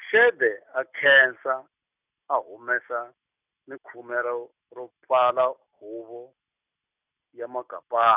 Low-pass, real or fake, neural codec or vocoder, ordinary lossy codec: 3.6 kHz; real; none; none